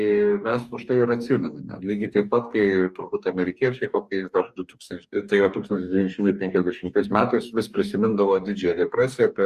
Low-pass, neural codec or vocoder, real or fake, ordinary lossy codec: 14.4 kHz; codec, 44.1 kHz, 2.6 kbps, DAC; fake; Opus, 64 kbps